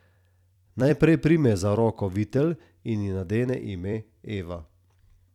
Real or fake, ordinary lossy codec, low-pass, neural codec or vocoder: fake; none; 19.8 kHz; vocoder, 44.1 kHz, 128 mel bands every 256 samples, BigVGAN v2